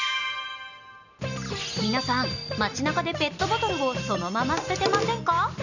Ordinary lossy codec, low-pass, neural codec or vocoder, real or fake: none; 7.2 kHz; none; real